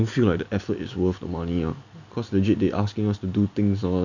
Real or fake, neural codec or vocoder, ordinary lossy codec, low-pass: real; none; none; 7.2 kHz